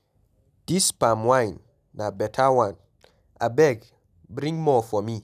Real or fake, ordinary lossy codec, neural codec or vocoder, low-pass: real; none; none; 14.4 kHz